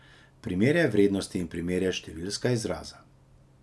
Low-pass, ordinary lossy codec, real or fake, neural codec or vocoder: none; none; real; none